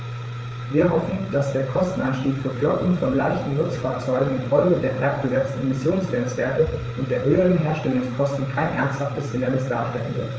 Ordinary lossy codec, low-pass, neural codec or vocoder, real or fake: none; none; codec, 16 kHz, 8 kbps, FreqCodec, larger model; fake